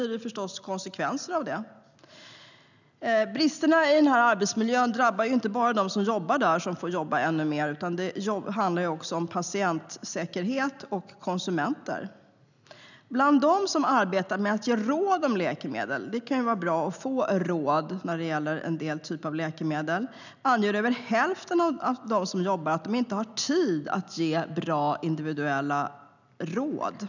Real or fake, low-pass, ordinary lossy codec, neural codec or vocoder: real; 7.2 kHz; none; none